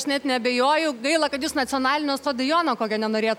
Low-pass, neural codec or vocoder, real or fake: 19.8 kHz; none; real